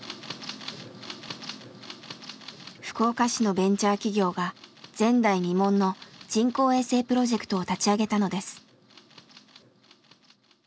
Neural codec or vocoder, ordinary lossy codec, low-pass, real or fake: none; none; none; real